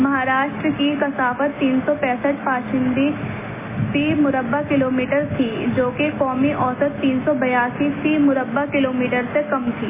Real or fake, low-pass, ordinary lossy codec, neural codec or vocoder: real; 3.6 kHz; MP3, 16 kbps; none